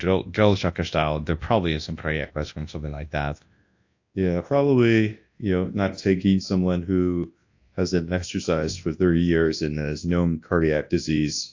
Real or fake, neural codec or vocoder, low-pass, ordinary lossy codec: fake; codec, 24 kHz, 0.9 kbps, WavTokenizer, large speech release; 7.2 kHz; AAC, 48 kbps